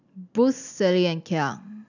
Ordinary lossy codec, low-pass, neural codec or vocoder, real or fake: none; 7.2 kHz; none; real